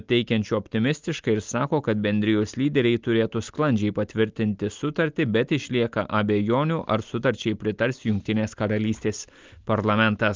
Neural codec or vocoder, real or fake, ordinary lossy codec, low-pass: none; real; Opus, 24 kbps; 7.2 kHz